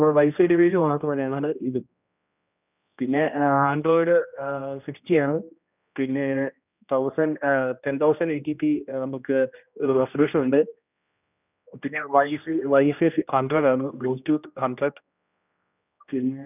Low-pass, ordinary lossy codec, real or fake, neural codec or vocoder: 3.6 kHz; none; fake; codec, 16 kHz, 1 kbps, X-Codec, HuBERT features, trained on general audio